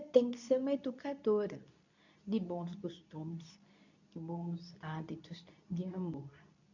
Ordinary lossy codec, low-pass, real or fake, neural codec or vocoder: none; 7.2 kHz; fake; codec, 24 kHz, 0.9 kbps, WavTokenizer, medium speech release version 2